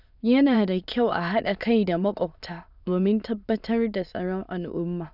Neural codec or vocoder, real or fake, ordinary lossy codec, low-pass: autoencoder, 22.05 kHz, a latent of 192 numbers a frame, VITS, trained on many speakers; fake; none; 5.4 kHz